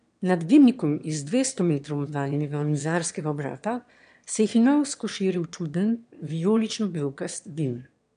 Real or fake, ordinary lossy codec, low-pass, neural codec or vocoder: fake; none; 9.9 kHz; autoencoder, 22.05 kHz, a latent of 192 numbers a frame, VITS, trained on one speaker